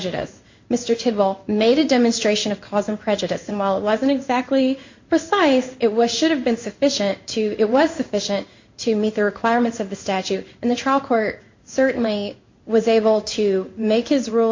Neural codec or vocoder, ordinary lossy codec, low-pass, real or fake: codec, 16 kHz in and 24 kHz out, 1 kbps, XY-Tokenizer; MP3, 48 kbps; 7.2 kHz; fake